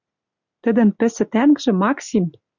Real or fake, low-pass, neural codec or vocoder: real; 7.2 kHz; none